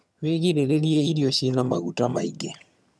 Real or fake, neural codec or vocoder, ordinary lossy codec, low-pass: fake; vocoder, 22.05 kHz, 80 mel bands, HiFi-GAN; none; none